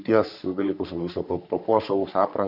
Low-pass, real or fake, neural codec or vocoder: 5.4 kHz; fake; codec, 44.1 kHz, 3.4 kbps, Pupu-Codec